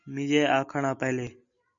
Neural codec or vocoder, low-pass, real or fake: none; 7.2 kHz; real